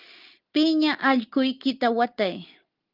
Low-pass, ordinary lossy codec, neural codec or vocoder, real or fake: 5.4 kHz; Opus, 32 kbps; none; real